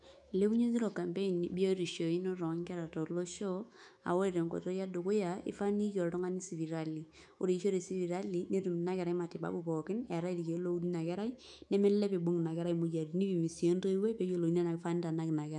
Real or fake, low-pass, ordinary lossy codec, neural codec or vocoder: fake; 10.8 kHz; none; autoencoder, 48 kHz, 128 numbers a frame, DAC-VAE, trained on Japanese speech